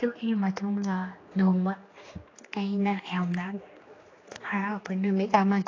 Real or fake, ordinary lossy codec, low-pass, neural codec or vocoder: fake; AAC, 32 kbps; 7.2 kHz; codec, 16 kHz, 2 kbps, X-Codec, HuBERT features, trained on general audio